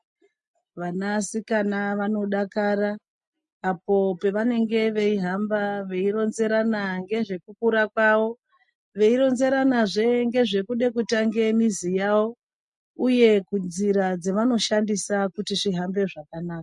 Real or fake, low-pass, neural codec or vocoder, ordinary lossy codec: real; 10.8 kHz; none; MP3, 48 kbps